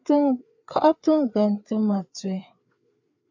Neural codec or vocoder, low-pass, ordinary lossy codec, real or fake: codec, 16 kHz, 4 kbps, FreqCodec, larger model; 7.2 kHz; AAC, 48 kbps; fake